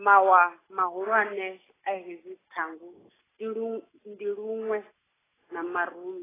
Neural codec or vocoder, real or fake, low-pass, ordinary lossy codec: none; real; 3.6 kHz; AAC, 16 kbps